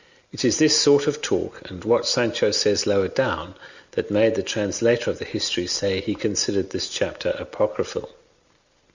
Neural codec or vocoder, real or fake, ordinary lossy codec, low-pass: none; real; Opus, 64 kbps; 7.2 kHz